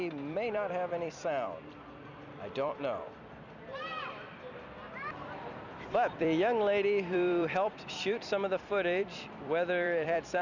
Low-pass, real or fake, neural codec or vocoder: 7.2 kHz; fake; vocoder, 44.1 kHz, 128 mel bands every 256 samples, BigVGAN v2